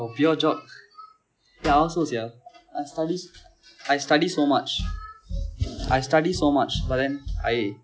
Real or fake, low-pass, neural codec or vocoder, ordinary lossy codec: real; none; none; none